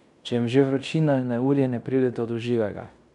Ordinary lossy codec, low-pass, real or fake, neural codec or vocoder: none; 10.8 kHz; fake; codec, 16 kHz in and 24 kHz out, 0.9 kbps, LongCat-Audio-Codec, fine tuned four codebook decoder